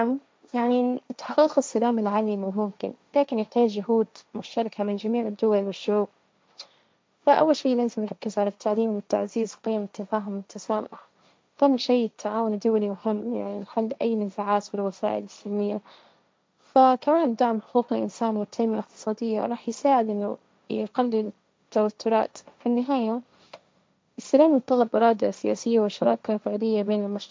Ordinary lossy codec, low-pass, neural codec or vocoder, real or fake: none; none; codec, 16 kHz, 1.1 kbps, Voila-Tokenizer; fake